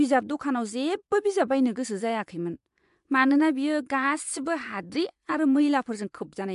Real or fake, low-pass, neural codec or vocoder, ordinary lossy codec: fake; 10.8 kHz; vocoder, 24 kHz, 100 mel bands, Vocos; AAC, 96 kbps